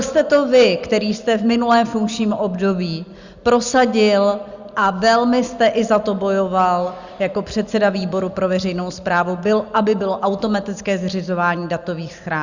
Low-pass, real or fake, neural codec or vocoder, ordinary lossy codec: 7.2 kHz; real; none; Opus, 64 kbps